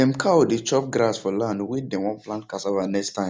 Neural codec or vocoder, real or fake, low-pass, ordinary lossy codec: none; real; none; none